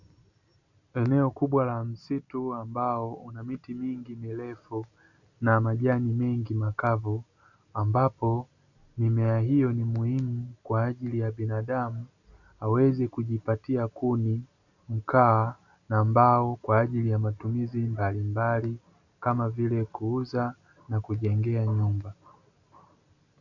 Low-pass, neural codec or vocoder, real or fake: 7.2 kHz; none; real